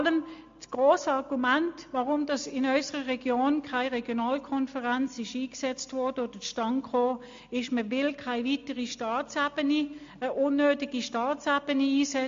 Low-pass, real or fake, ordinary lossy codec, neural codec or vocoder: 7.2 kHz; real; MP3, 48 kbps; none